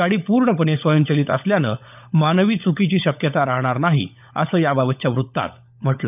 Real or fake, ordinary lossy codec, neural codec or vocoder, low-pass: fake; none; codec, 16 kHz, 16 kbps, FunCodec, trained on LibriTTS, 50 frames a second; 3.6 kHz